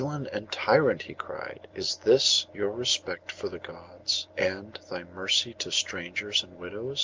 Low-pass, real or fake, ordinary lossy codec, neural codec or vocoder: 7.2 kHz; real; Opus, 32 kbps; none